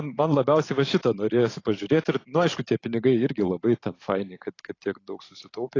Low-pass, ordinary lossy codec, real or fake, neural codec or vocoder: 7.2 kHz; AAC, 32 kbps; fake; vocoder, 44.1 kHz, 128 mel bands every 512 samples, BigVGAN v2